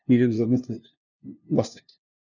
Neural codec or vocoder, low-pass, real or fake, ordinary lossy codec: codec, 16 kHz, 0.5 kbps, FunCodec, trained on LibriTTS, 25 frames a second; 7.2 kHz; fake; none